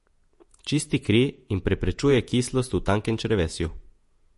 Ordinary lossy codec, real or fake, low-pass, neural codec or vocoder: MP3, 48 kbps; fake; 14.4 kHz; vocoder, 48 kHz, 128 mel bands, Vocos